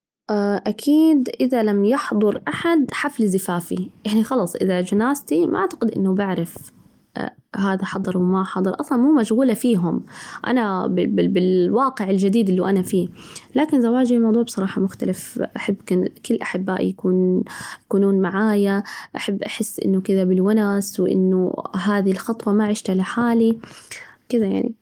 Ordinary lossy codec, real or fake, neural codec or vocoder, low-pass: Opus, 24 kbps; real; none; 19.8 kHz